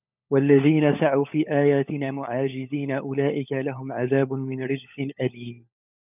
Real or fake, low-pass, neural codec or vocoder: fake; 3.6 kHz; codec, 16 kHz, 16 kbps, FunCodec, trained on LibriTTS, 50 frames a second